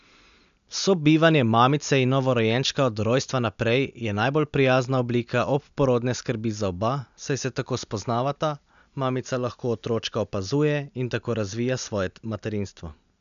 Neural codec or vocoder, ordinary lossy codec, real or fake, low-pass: none; none; real; 7.2 kHz